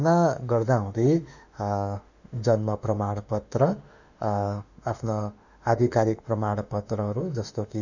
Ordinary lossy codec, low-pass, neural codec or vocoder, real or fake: none; 7.2 kHz; autoencoder, 48 kHz, 32 numbers a frame, DAC-VAE, trained on Japanese speech; fake